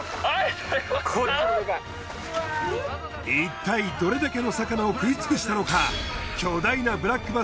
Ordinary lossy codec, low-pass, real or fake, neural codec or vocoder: none; none; real; none